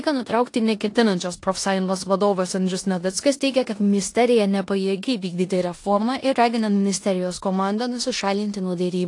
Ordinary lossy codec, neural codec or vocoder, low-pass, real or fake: AAC, 48 kbps; codec, 16 kHz in and 24 kHz out, 0.9 kbps, LongCat-Audio-Codec, four codebook decoder; 10.8 kHz; fake